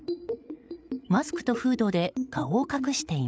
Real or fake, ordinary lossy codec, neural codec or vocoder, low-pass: fake; none; codec, 16 kHz, 8 kbps, FreqCodec, larger model; none